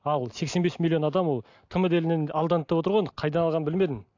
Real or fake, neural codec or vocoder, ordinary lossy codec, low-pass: real; none; AAC, 48 kbps; 7.2 kHz